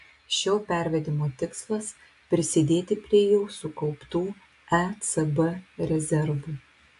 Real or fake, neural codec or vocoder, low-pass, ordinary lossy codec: real; none; 10.8 kHz; AAC, 64 kbps